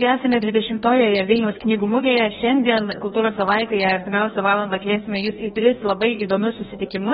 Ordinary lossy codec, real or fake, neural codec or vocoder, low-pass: AAC, 16 kbps; fake; codec, 16 kHz, 1 kbps, FreqCodec, larger model; 7.2 kHz